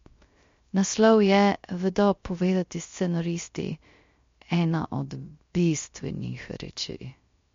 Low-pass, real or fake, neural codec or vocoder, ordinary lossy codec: 7.2 kHz; fake; codec, 16 kHz, 0.3 kbps, FocalCodec; MP3, 48 kbps